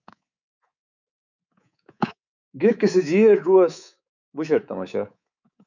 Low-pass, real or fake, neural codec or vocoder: 7.2 kHz; fake; codec, 24 kHz, 3.1 kbps, DualCodec